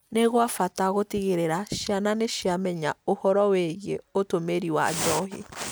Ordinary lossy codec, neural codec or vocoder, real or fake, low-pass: none; none; real; none